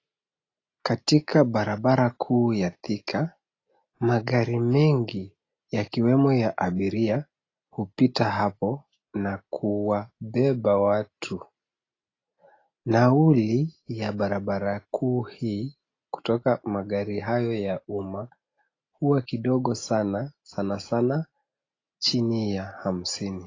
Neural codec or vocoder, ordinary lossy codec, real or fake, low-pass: none; AAC, 32 kbps; real; 7.2 kHz